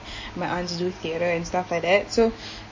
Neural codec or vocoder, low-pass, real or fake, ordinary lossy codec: none; 7.2 kHz; real; MP3, 32 kbps